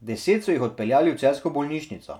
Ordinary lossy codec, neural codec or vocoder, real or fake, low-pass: none; none; real; 19.8 kHz